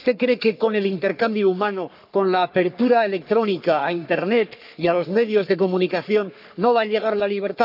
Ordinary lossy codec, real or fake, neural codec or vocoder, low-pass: none; fake; codec, 44.1 kHz, 3.4 kbps, Pupu-Codec; 5.4 kHz